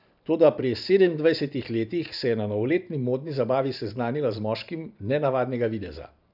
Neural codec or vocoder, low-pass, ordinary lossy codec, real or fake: vocoder, 44.1 kHz, 128 mel bands every 512 samples, BigVGAN v2; 5.4 kHz; none; fake